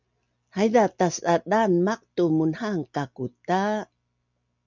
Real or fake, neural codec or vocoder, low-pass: real; none; 7.2 kHz